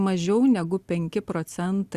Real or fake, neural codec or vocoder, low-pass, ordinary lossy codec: real; none; 14.4 kHz; Opus, 64 kbps